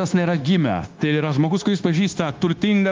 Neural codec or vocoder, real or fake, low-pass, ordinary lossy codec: codec, 16 kHz, 0.9 kbps, LongCat-Audio-Codec; fake; 7.2 kHz; Opus, 32 kbps